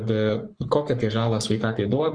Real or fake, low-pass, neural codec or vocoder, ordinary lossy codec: fake; 9.9 kHz; codec, 44.1 kHz, 3.4 kbps, Pupu-Codec; Opus, 24 kbps